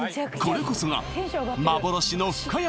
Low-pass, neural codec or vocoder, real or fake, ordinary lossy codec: none; none; real; none